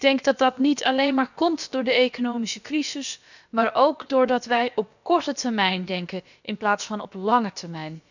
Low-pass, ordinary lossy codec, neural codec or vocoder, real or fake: 7.2 kHz; none; codec, 16 kHz, about 1 kbps, DyCAST, with the encoder's durations; fake